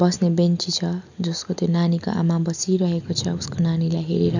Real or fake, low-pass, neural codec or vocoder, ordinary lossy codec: real; 7.2 kHz; none; none